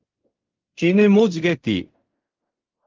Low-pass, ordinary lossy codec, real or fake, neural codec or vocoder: 7.2 kHz; Opus, 32 kbps; fake; codec, 16 kHz in and 24 kHz out, 0.4 kbps, LongCat-Audio-Codec, fine tuned four codebook decoder